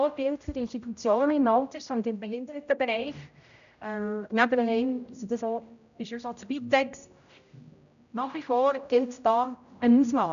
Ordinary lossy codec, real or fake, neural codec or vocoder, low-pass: none; fake; codec, 16 kHz, 0.5 kbps, X-Codec, HuBERT features, trained on general audio; 7.2 kHz